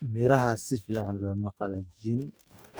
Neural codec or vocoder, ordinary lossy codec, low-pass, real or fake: codec, 44.1 kHz, 2.6 kbps, DAC; none; none; fake